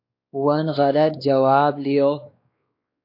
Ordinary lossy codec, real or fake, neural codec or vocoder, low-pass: AAC, 32 kbps; fake; codec, 16 kHz, 2 kbps, X-Codec, WavLM features, trained on Multilingual LibriSpeech; 5.4 kHz